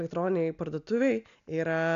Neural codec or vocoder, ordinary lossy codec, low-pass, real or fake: none; AAC, 96 kbps; 7.2 kHz; real